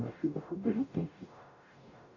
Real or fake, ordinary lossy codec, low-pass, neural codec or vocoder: fake; none; 7.2 kHz; codec, 44.1 kHz, 0.9 kbps, DAC